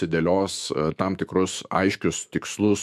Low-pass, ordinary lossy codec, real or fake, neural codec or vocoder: 14.4 kHz; MP3, 96 kbps; fake; autoencoder, 48 kHz, 128 numbers a frame, DAC-VAE, trained on Japanese speech